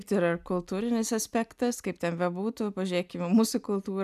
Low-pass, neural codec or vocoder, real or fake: 14.4 kHz; none; real